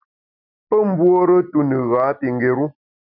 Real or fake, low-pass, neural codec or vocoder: real; 5.4 kHz; none